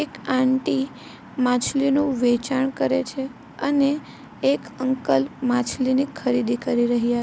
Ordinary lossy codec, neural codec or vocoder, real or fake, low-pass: none; none; real; none